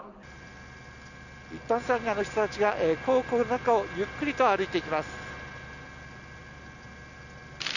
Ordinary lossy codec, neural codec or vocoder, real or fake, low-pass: none; none; real; 7.2 kHz